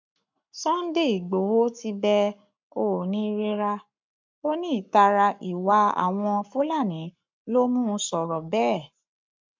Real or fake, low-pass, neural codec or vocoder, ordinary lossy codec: fake; 7.2 kHz; codec, 44.1 kHz, 7.8 kbps, Pupu-Codec; MP3, 64 kbps